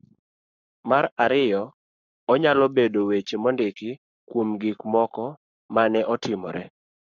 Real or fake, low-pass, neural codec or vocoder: fake; 7.2 kHz; codec, 44.1 kHz, 7.8 kbps, Pupu-Codec